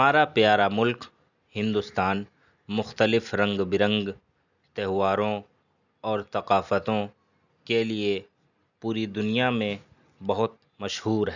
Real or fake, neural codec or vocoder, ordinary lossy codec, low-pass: real; none; none; 7.2 kHz